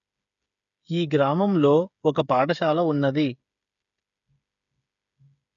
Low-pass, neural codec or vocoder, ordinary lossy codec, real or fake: 7.2 kHz; codec, 16 kHz, 8 kbps, FreqCodec, smaller model; none; fake